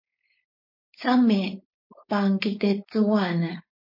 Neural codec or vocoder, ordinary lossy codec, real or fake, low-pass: codec, 16 kHz, 4.8 kbps, FACodec; MP3, 32 kbps; fake; 5.4 kHz